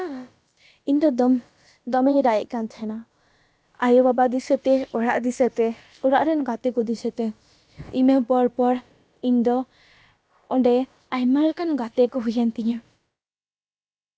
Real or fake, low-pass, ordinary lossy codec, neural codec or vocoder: fake; none; none; codec, 16 kHz, about 1 kbps, DyCAST, with the encoder's durations